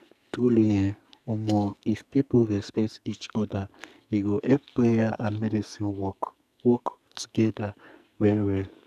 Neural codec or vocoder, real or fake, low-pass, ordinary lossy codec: codec, 44.1 kHz, 2.6 kbps, SNAC; fake; 14.4 kHz; none